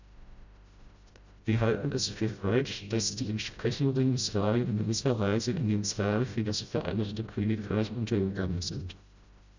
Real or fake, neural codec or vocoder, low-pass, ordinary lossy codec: fake; codec, 16 kHz, 0.5 kbps, FreqCodec, smaller model; 7.2 kHz; Opus, 64 kbps